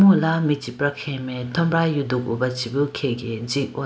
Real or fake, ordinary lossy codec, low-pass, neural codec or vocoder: real; none; none; none